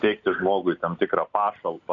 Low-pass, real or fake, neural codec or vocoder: 7.2 kHz; real; none